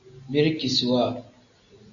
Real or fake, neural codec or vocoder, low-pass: real; none; 7.2 kHz